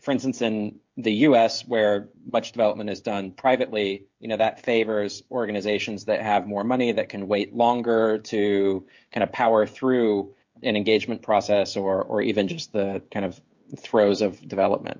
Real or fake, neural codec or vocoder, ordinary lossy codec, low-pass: fake; codec, 16 kHz, 16 kbps, FreqCodec, smaller model; MP3, 48 kbps; 7.2 kHz